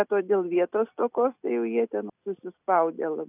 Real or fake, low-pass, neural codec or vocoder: real; 3.6 kHz; none